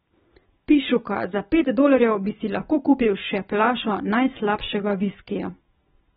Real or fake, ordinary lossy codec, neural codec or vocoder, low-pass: real; AAC, 16 kbps; none; 9.9 kHz